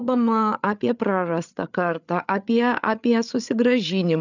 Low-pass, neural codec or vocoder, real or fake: 7.2 kHz; codec, 16 kHz, 8 kbps, FreqCodec, larger model; fake